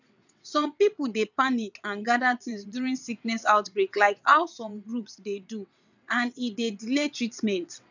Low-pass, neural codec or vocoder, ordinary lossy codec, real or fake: 7.2 kHz; vocoder, 22.05 kHz, 80 mel bands, WaveNeXt; none; fake